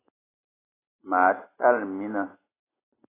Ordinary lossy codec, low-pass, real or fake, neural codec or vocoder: AAC, 16 kbps; 3.6 kHz; real; none